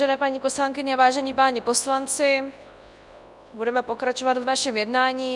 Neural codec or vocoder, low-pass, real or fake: codec, 24 kHz, 0.9 kbps, WavTokenizer, large speech release; 10.8 kHz; fake